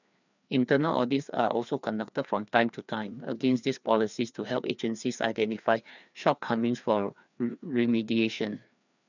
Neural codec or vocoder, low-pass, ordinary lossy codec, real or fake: codec, 16 kHz, 2 kbps, FreqCodec, larger model; 7.2 kHz; none; fake